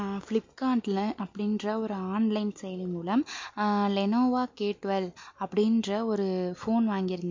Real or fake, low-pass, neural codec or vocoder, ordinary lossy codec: real; 7.2 kHz; none; MP3, 48 kbps